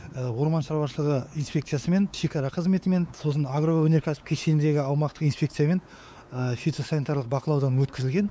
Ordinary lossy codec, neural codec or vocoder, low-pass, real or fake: none; codec, 16 kHz, 4 kbps, X-Codec, WavLM features, trained on Multilingual LibriSpeech; none; fake